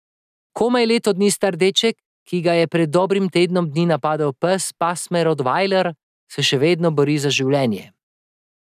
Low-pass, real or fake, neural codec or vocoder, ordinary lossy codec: 14.4 kHz; real; none; none